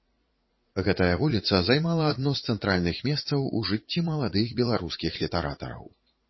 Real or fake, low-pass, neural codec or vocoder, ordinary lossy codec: fake; 7.2 kHz; vocoder, 44.1 kHz, 80 mel bands, Vocos; MP3, 24 kbps